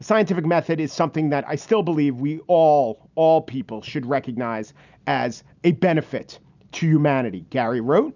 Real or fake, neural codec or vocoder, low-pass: real; none; 7.2 kHz